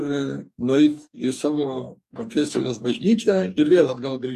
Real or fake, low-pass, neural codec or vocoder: fake; 14.4 kHz; codec, 44.1 kHz, 2.6 kbps, DAC